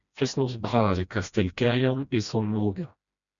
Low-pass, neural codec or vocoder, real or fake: 7.2 kHz; codec, 16 kHz, 1 kbps, FreqCodec, smaller model; fake